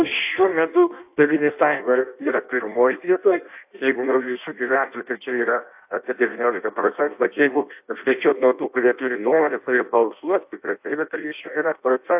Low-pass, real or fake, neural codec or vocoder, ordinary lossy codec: 3.6 kHz; fake; codec, 16 kHz in and 24 kHz out, 0.6 kbps, FireRedTTS-2 codec; AAC, 32 kbps